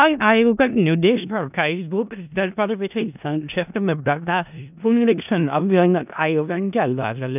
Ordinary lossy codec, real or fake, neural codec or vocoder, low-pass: none; fake; codec, 16 kHz in and 24 kHz out, 0.4 kbps, LongCat-Audio-Codec, four codebook decoder; 3.6 kHz